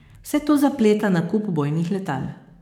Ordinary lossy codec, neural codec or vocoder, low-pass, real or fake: none; codec, 44.1 kHz, 7.8 kbps, DAC; 19.8 kHz; fake